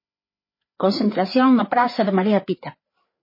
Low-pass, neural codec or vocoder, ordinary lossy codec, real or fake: 5.4 kHz; codec, 16 kHz, 4 kbps, FreqCodec, larger model; MP3, 24 kbps; fake